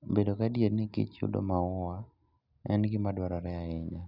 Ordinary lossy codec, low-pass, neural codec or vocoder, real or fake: none; 5.4 kHz; none; real